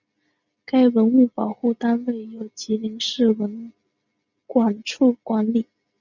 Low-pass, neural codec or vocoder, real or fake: 7.2 kHz; none; real